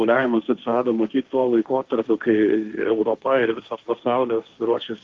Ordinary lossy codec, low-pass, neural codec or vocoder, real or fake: Opus, 24 kbps; 7.2 kHz; codec, 16 kHz, 1.1 kbps, Voila-Tokenizer; fake